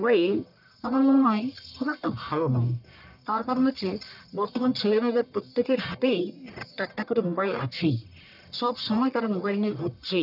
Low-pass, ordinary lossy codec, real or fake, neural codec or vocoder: 5.4 kHz; none; fake; codec, 44.1 kHz, 1.7 kbps, Pupu-Codec